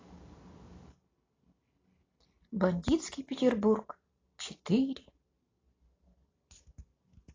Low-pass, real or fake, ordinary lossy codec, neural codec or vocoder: 7.2 kHz; real; AAC, 32 kbps; none